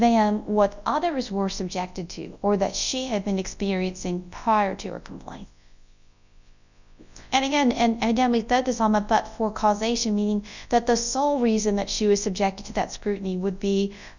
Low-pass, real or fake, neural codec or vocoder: 7.2 kHz; fake; codec, 24 kHz, 0.9 kbps, WavTokenizer, large speech release